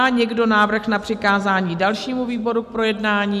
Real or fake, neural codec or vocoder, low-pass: real; none; 14.4 kHz